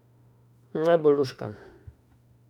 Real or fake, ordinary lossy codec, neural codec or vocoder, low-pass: fake; none; autoencoder, 48 kHz, 32 numbers a frame, DAC-VAE, trained on Japanese speech; 19.8 kHz